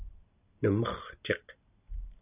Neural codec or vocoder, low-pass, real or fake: none; 3.6 kHz; real